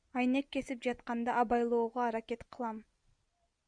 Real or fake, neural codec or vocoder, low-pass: real; none; 9.9 kHz